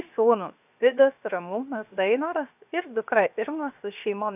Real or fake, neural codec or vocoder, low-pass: fake; codec, 16 kHz, 0.7 kbps, FocalCodec; 3.6 kHz